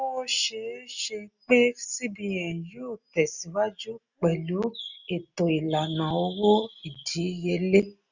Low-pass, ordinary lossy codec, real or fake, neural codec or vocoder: 7.2 kHz; none; real; none